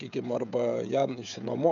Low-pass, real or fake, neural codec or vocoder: 7.2 kHz; fake; codec, 16 kHz, 16 kbps, FreqCodec, smaller model